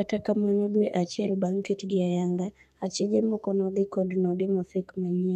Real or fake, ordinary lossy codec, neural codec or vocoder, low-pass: fake; none; codec, 32 kHz, 1.9 kbps, SNAC; 14.4 kHz